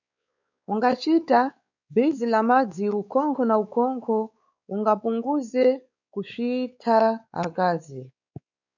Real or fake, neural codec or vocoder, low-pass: fake; codec, 16 kHz, 4 kbps, X-Codec, WavLM features, trained on Multilingual LibriSpeech; 7.2 kHz